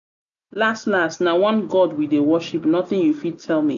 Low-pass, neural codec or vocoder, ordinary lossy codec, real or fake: 7.2 kHz; none; none; real